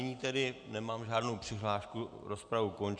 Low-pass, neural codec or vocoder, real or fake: 9.9 kHz; none; real